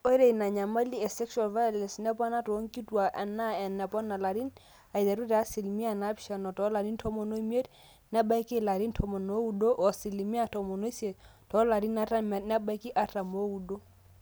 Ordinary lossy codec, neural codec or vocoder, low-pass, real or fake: none; none; none; real